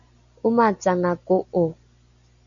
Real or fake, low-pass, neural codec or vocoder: real; 7.2 kHz; none